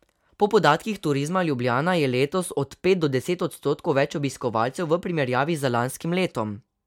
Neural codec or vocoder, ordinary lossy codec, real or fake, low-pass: none; MP3, 96 kbps; real; 19.8 kHz